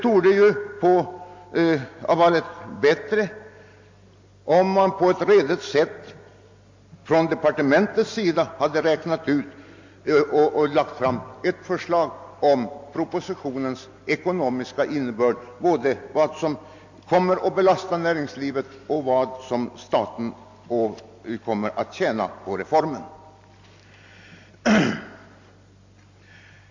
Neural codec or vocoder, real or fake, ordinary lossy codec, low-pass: none; real; MP3, 48 kbps; 7.2 kHz